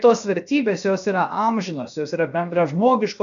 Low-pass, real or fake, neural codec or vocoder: 7.2 kHz; fake; codec, 16 kHz, 0.7 kbps, FocalCodec